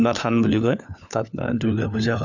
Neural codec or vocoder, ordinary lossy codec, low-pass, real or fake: codec, 16 kHz, 4 kbps, FunCodec, trained on LibriTTS, 50 frames a second; none; 7.2 kHz; fake